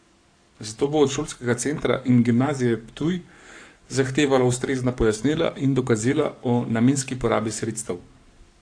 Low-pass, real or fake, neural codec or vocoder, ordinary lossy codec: 9.9 kHz; fake; codec, 44.1 kHz, 7.8 kbps, Pupu-Codec; AAC, 48 kbps